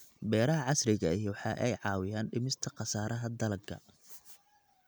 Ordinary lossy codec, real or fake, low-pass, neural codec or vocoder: none; real; none; none